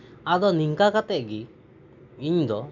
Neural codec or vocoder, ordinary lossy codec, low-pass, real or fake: none; none; 7.2 kHz; real